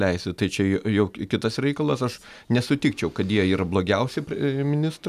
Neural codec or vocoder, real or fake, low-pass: none; real; 14.4 kHz